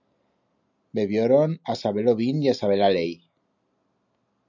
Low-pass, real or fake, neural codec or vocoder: 7.2 kHz; real; none